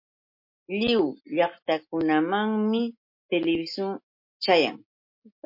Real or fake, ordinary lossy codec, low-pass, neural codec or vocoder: real; MP3, 32 kbps; 5.4 kHz; none